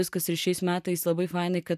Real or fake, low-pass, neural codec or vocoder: real; 14.4 kHz; none